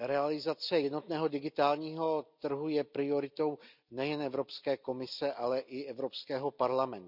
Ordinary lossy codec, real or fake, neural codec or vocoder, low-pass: none; real; none; 5.4 kHz